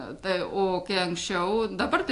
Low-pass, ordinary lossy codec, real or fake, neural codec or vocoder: 10.8 kHz; AAC, 48 kbps; real; none